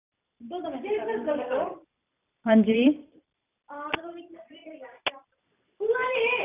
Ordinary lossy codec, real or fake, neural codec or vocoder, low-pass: none; fake; vocoder, 44.1 kHz, 128 mel bands every 256 samples, BigVGAN v2; 3.6 kHz